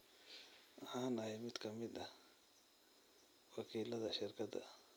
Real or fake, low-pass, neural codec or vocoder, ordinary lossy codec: real; none; none; none